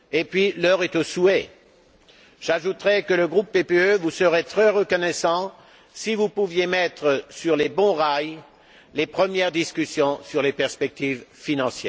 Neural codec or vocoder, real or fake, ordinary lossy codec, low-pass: none; real; none; none